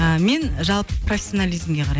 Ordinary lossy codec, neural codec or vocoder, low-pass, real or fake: none; none; none; real